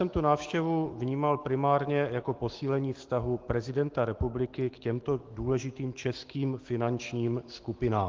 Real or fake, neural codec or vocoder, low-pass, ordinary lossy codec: fake; autoencoder, 48 kHz, 128 numbers a frame, DAC-VAE, trained on Japanese speech; 7.2 kHz; Opus, 16 kbps